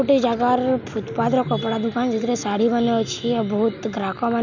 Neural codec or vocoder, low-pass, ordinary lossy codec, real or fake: none; 7.2 kHz; none; real